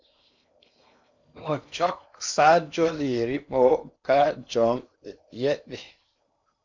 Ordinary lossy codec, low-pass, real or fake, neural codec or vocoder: MP3, 64 kbps; 7.2 kHz; fake; codec, 16 kHz in and 24 kHz out, 0.8 kbps, FocalCodec, streaming, 65536 codes